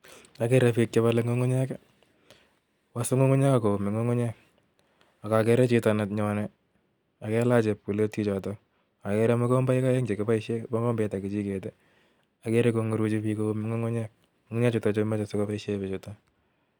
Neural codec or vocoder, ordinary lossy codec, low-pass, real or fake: none; none; none; real